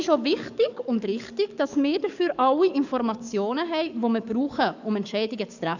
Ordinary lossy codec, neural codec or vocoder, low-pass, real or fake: none; codec, 44.1 kHz, 7.8 kbps, DAC; 7.2 kHz; fake